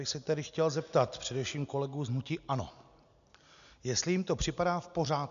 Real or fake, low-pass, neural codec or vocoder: real; 7.2 kHz; none